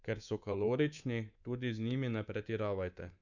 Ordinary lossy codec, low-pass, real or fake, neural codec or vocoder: none; 7.2 kHz; fake; codec, 24 kHz, 3.1 kbps, DualCodec